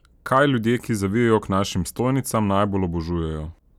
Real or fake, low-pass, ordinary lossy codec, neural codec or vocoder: real; 19.8 kHz; none; none